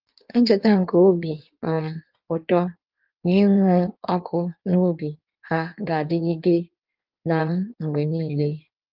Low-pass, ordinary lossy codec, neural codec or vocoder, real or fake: 5.4 kHz; Opus, 24 kbps; codec, 16 kHz in and 24 kHz out, 1.1 kbps, FireRedTTS-2 codec; fake